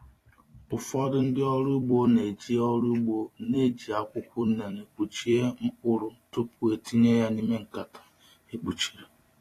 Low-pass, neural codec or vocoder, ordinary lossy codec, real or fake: 14.4 kHz; vocoder, 44.1 kHz, 128 mel bands every 256 samples, BigVGAN v2; AAC, 48 kbps; fake